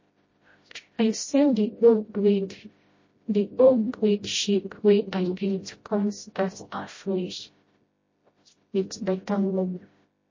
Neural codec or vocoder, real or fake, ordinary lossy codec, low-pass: codec, 16 kHz, 0.5 kbps, FreqCodec, smaller model; fake; MP3, 32 kbps; 7.2 kHz